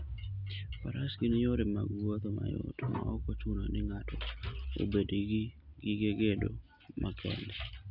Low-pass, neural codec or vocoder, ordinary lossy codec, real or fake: 5.4 kHz; none; none; real